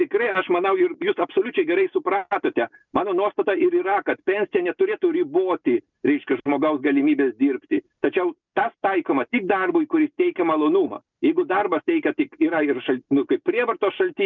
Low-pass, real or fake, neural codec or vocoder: 7.2 kHz; real; none